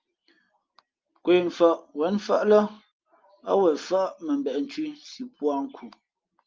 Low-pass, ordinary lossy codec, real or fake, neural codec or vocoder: 7.2 kHz; Opus, 32 kbps; real; none